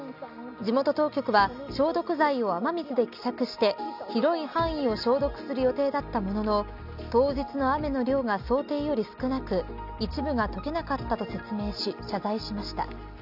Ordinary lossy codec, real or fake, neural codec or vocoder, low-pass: none; real; none; 5.4 kHz